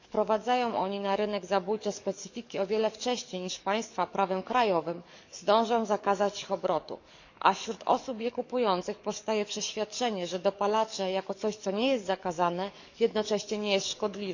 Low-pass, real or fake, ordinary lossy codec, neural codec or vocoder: 7.2 kHz; fake; none; codec, 44.1 kHz, 7.8 kbps, DAC